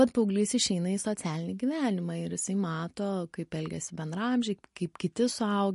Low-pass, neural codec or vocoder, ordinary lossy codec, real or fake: 14.4 kHz; none; MP3, 48 kbps; real